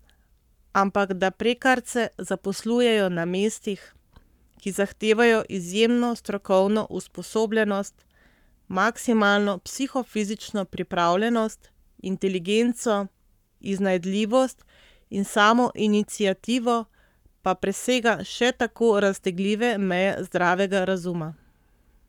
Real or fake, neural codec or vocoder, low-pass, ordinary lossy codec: fake; codec, 44.1 kHz, 7.8 kbps, Pupu-Codec; 19.8 kHz; none